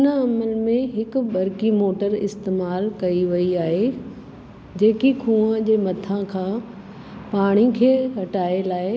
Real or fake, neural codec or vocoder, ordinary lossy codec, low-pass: real; none; none; none